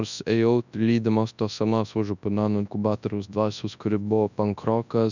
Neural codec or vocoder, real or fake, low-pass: codec, 24 kHz, 0.9 kbps, WavTokenizer, large speech release; fake; 7.2 kHz